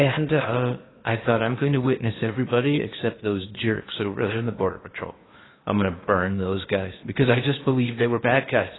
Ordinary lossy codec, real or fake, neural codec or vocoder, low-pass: AAC, 16 kbps; fake; codec, 16 kHz in and 24 kHz out, 0.8 kbps, FocalCodec, streaming, 65536 codes; 7.2 kHz